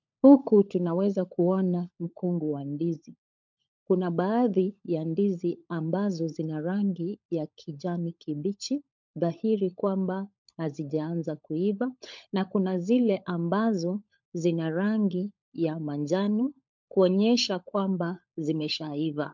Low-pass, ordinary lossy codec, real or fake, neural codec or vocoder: 7.2 kHz; MP3, 64 kbps; fake; codec, 16 kHz, 16 kbps, FunCodec, trained on LibriTTS, 50 frames a second